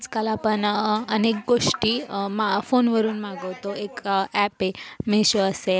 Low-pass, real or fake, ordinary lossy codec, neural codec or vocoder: none; real; none; none